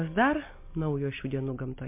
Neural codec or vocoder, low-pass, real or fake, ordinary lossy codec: none; 3.6 kHz; real; MP3, 24 kbps